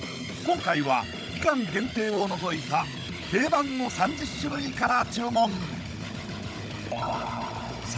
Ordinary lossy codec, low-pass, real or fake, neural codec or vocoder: none; none; fake; codec, 16 kHz, 16 kbps, FunCodec, trained on Chinese and English, 50 frames a second